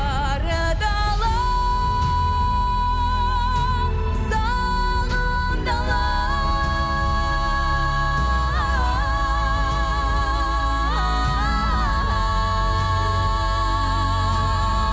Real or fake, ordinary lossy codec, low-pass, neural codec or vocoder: real; none; none; none